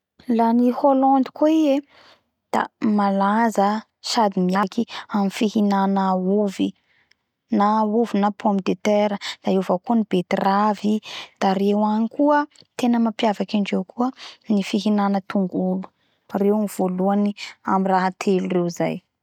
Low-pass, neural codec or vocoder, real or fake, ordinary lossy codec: 19.8 kHz; none; real; none